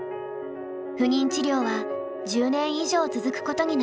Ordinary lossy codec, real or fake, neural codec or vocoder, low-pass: none; real; none; none